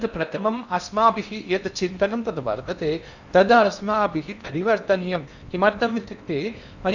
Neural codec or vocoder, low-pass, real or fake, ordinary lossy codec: codec, 16 kHz in and 24 kHz out, 0.8 kbps, FocalCodec, streaming, 65536 codes; 7.2 kHz; fake; none